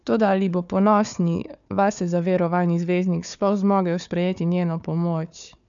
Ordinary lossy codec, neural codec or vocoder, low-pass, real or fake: MP3, 96 kbps; codec, 16 kHz, 4 kbps, FunCodec, trained on Chinese and English, 50 frames a second; 7.2 kHz; fake